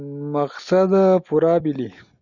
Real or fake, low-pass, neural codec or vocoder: real; 7.2 kHz; none